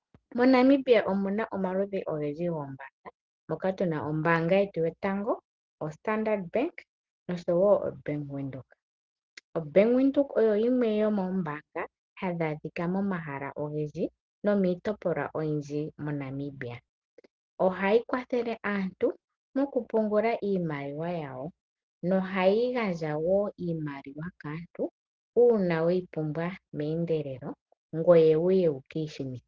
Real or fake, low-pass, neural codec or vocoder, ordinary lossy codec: real; 7.2 kHz; none; Opus, 16 kbps